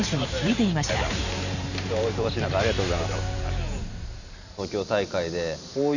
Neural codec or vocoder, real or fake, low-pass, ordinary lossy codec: vocoder, 44.1 kHz, 128 mel bands every 512 samples, BigVGAN v2; fake; 7.2 kHz; none